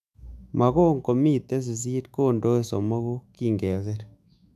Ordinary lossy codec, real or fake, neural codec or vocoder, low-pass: none; fake; autoencoder, 48 kHz, 128 numbers a frame, DAC-VAE, trained on Japanese speech; 14.4 kHz